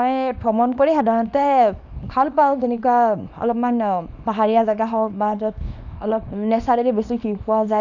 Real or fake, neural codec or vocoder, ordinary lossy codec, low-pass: fake; codec, 24 kHz, 0.9 kbps, WavTokenizer, small release; none; 7.2 kHz